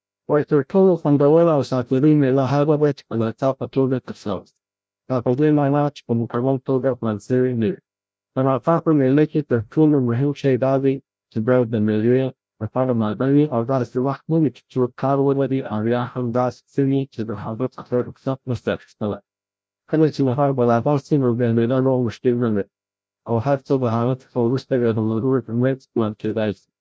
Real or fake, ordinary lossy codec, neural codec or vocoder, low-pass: fake; none; codec, 16 kHz, 0.5 kbps, FreqCodec, larger model; none